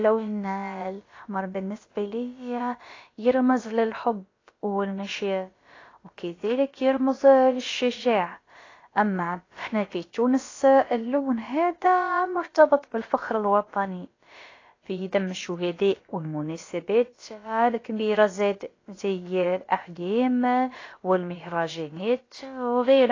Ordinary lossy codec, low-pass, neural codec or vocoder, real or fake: AAC, 32 kbps; 7.2 kHz; codec, 16 kHz, about 1 kbps, DyCAST, with the encoder's durations; fake